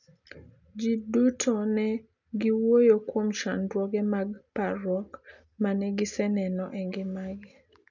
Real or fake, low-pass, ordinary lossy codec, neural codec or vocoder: real; 7.2 kHz; none; none